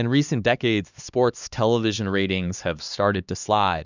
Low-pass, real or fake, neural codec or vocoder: 7.2 kHz; fake; codec, 16 kHz, 2 kbps, X-Codec, HuBERT features, trained on LibriSpeech